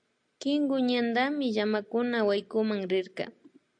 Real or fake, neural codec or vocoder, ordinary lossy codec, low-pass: real; none; AAC, 64 kbps; 9.9 kHz